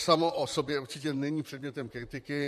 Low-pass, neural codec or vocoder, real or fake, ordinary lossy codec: 14.4 kHz; vocoder, 44.1 kHz, 128 mel bands, Pupu-Vocoder; fake; MP3, 64 kbps